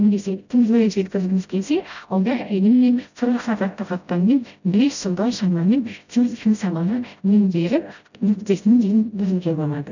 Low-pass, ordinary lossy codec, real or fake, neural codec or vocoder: 7.2 kHz; none; fake; codec, 16 kHz, 0.5 kbps, FreqCodec, smaller model